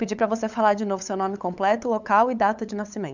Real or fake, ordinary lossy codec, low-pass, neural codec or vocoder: fake; none; 7.2 kHz; codec, 16 kHz, 8 kbps, FunCodec, trained on LibriTTS, 25 frames a second